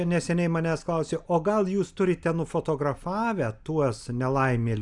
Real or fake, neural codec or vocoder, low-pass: real; none; 10.8 kHz